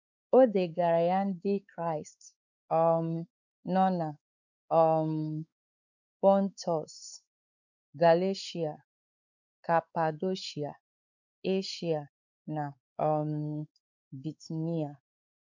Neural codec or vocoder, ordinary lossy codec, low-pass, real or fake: codec, 16 kHz, 4 kbps, X-Codec, WavLM features, trained on Multilingual LibriSpeech; none; 7.2 kHz; fake